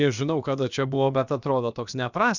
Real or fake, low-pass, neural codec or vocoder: fake; 7.2 kHz; codec, 16 kHz, about 1 kbps, DyCAST, with the encoder's durations